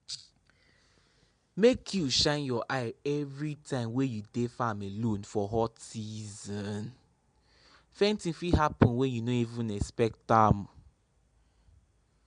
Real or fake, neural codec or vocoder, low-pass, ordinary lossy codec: real; none; 9.9 kHz; MP3, 64 kbps